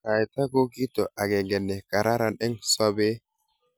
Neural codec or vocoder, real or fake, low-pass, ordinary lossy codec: none; real; none; none